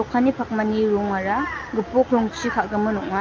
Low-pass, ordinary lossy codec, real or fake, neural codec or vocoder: 7.2 kHz; Opus, 24 kbps; real; none